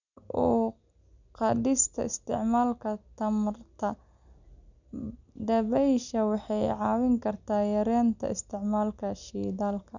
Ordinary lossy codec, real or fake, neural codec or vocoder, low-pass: none; real; none; 7.2 kHz